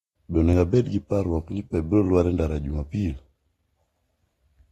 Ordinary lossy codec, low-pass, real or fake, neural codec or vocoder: AAC, 32 kbps; 19.8 kHz; real; none